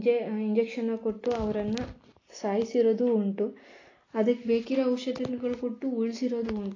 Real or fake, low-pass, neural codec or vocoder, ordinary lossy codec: real; 7.2 kHz; none; AAC, 32 kbps